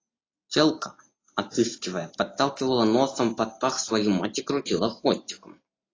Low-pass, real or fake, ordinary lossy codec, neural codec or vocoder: 7.2 kHz; real; AAC, 32 kbps; none